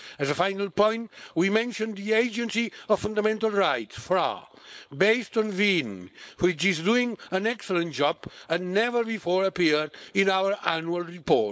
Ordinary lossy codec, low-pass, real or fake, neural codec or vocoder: none; none; fake; codec, 16 kHz, 4.8 kbps, FACodec